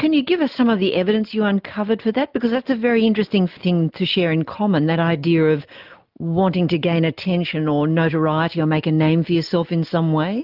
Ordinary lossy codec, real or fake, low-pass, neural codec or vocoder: Opus, 32 kbps; real; 5.4 kHz; none